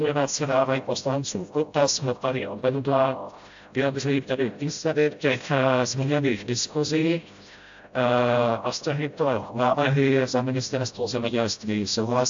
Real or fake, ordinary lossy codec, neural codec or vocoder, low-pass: fake; AAC, 64 kbps; codec, 16 kHz, 0.5 kbps, FreqCodec, smaller model; 7.2 kHz